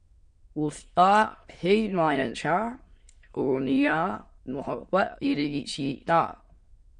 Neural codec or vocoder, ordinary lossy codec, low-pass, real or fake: autoencoder, 22.05 kHz, a latent of 192 numbers a frame, VITS, trained on many speakers; MP3, 48 kbps; 9.9 kHz; fake